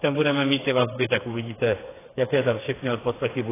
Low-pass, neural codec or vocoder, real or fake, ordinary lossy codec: 3.6 kHz; codec, 16 kHz, 4 kbps, FreqCodec, smaller model; fake; AAC, 16 kbps